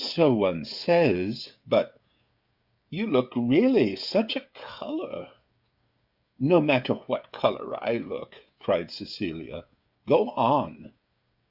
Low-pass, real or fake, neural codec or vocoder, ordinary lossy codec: 5.4 kHz; fake; codec, 16 kHz, 16 kbps, FreqCodec, smaller model; Opus, 64 kbps